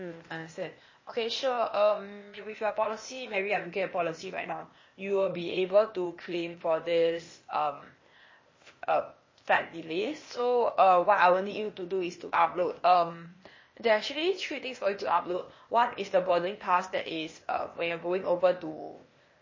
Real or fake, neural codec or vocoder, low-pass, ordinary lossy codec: fake; codec, 16 kHz, 0.8 kbps, ZipCodec; 7.2 kHz; MP3, 32 kbps